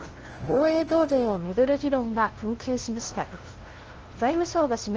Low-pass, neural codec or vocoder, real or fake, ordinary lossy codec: 7.2 kHz; codec, 16 kHz, 0.5 kbps, FunCodec, trained on LibriTTS, 25 frames a second; fake; Opus, 16 kbps